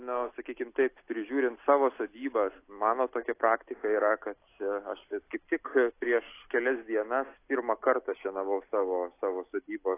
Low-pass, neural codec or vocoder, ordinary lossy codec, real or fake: 3.6 kHz; none; AAC, 24 kbps; real